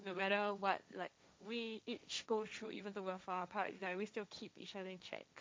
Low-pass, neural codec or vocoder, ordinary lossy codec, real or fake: none; codec, 16 kHz, 1.1 kbps, Voila-Tokenizer; none; fake